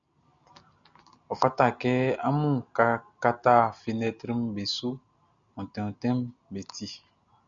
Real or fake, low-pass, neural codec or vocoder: real; 7.2 kHz; none